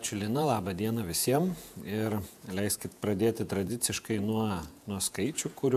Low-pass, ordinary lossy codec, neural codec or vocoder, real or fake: 14.4 kHz; AAC, 96 kbps; vocoder, 48 kHz, 128 mel bands, Vocos; fake